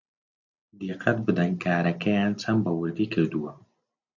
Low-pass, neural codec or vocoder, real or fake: 7.2 kHz; none; real